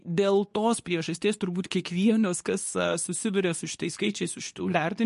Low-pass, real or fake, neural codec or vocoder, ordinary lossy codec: 10.8 kHz; fake; codec, 24 kHz, 0.9 kbps, WavTokenizer, medium speech release version 2; MP3, 48 kbps